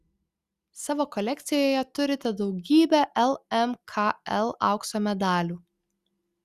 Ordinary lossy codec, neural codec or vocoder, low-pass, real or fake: Opus, 64 kbps; none; 14.4 kHz; real